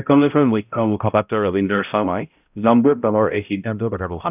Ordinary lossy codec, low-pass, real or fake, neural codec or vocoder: none; 3.6 kHz; fake; codec, 16 kHz, 0.5 kbps, X-Codec, HuBERT features, trained on balanced general audio